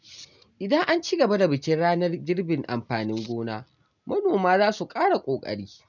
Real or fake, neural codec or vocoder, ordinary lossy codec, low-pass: real; none; none; 7.2 kHz